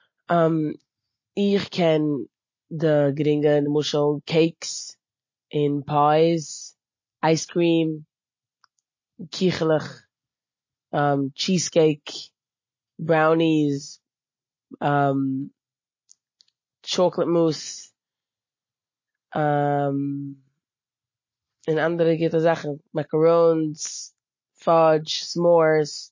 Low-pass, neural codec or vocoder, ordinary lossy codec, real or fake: 7.2 kHz; none; MP3, 32 kbps; real